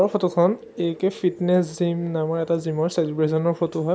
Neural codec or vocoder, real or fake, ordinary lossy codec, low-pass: none; real; none; none